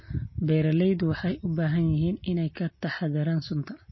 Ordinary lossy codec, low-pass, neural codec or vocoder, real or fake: MP3, 24 kbps; 7.2 kHz; none; real